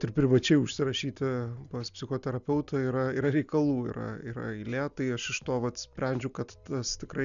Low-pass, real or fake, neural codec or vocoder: 7.2 kHz; real; none